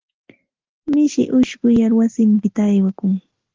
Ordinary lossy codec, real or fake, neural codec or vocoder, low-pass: Opus, 16 kbps; real; none; 7.2 kHz